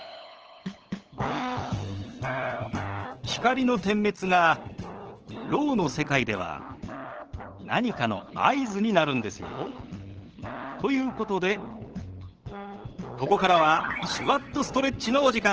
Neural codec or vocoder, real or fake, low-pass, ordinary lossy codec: codec, 16 kHz, 8 kbps, FunCodec, trained on LibriTTS, 25 frames a second; fake; 7.2 kHz; Opus, 16 kbps